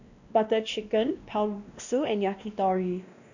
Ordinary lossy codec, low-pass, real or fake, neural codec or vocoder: none; 7.2 kHz; fake; codec, 16 kHz, 1 kbps, X-Codec, WavLM features, trained on Multilingual LibriSpeech